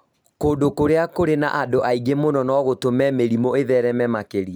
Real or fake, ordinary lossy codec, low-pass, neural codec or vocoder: real; none; none; none